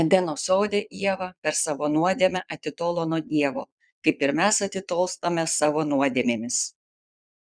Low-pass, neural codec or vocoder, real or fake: 9.9 kHz; vocoder, 22.05 kHz, 80 mel bands, Vocos; fake